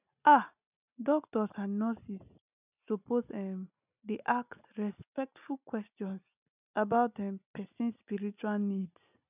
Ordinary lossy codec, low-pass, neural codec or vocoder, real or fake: none; 3.6 kHz; none; real